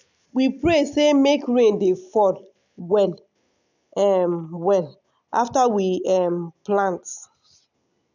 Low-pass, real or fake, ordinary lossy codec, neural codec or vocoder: 7.2 kHz; real; none; none